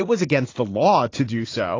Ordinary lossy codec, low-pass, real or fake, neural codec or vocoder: AAC, 32 kbps; 7.2 kHz; fake; vocoder, 44.1 kHz, 80 mel bands, Vocos